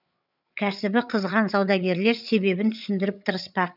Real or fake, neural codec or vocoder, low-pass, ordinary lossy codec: fake; codec, 16 kHz, 8 kbps, FreqCodec, larger model; 5.4 kHz; none